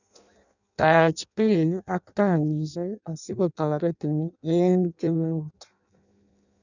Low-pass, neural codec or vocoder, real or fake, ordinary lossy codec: 7.2 kHz; codec, 16 kHz in and 24 kHz out, 0.6 kbps, FireRedTTS-2 codec; fake; none